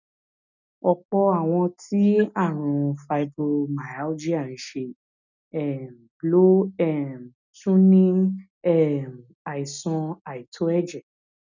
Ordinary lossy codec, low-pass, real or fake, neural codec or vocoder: none; 7.2 kHz; real; none